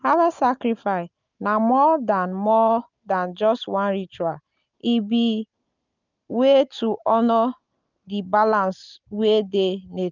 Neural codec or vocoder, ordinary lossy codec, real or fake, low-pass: none; none; real; 7.2 kHz